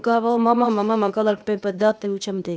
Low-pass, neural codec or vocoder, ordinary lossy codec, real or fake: none; codec, 16 kHz, 0.8 kbps, ZipCodec; none; fake